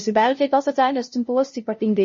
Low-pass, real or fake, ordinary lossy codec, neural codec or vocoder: 7.2 kHz; fake; MP3, 32 kbps; codec, 16 kHz, 0.5 kbps, FunCodec, trained on LibriTTS, 25 frames a second